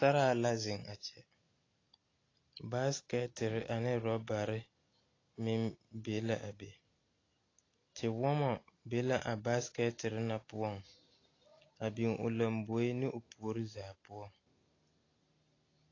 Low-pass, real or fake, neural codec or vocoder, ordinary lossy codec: 7.2 kHz; real; none; AAC, 32 kbps